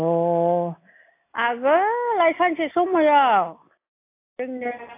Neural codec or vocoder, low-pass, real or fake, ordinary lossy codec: none; 3.6 kHz; real; AAC, 24 kbps